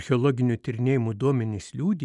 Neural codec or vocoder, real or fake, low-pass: none; real; 10.8 kHz